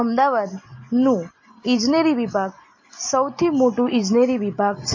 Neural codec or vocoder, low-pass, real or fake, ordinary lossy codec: none; 7.2 kHz; real; MP3, 32 kbps